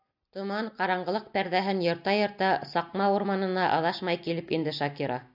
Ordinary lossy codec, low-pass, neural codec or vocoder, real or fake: AAC, 48 kbps; 5.4 kHz; none; real